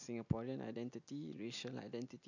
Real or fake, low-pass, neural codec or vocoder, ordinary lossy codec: real; 7.2 kHz; none; none